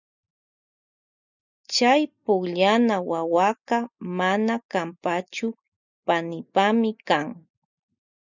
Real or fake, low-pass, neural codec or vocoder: real; 7.2 kHz; none